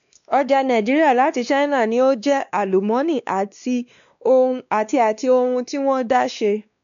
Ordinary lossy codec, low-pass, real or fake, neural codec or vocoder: none; 7.2 kHz; fake; codec, 16 kHz, 2 kbps, X-Codec, WavLM features, trained on Multilingual LibriSpeech